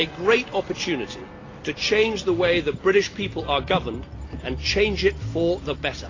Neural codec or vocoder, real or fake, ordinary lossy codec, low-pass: none; real; AAC, 32 kbps; 7.2 kHz